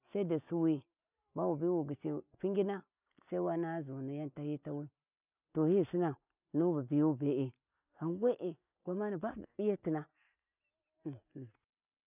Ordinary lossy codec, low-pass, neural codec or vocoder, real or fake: none; 3.6 kHz; none; real